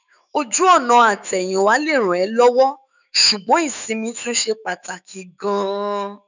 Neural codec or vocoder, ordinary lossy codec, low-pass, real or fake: autoencoder, 48 kHz, 128 numbers a frame, DAC-VAE, trained on Japanese speech; none; 7.2 kHz; fake